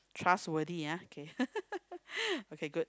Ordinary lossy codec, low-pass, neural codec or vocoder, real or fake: none; none; none; real